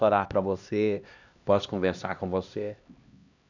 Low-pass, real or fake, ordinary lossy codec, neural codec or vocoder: 7.2 kHz; fake; none; codec, 16 kHz, 1 kbps, X-Codec, HuBERT features, trained on LibriSpeech